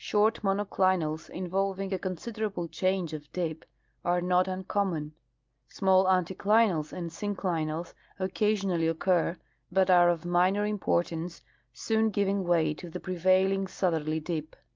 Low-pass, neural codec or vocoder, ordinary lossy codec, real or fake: 7.2 kHz; none; Opus, 24 kbps; real